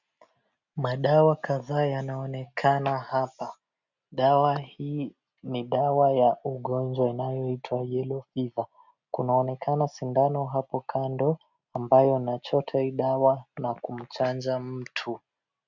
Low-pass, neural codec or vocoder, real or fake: 7.2 kHz; none; real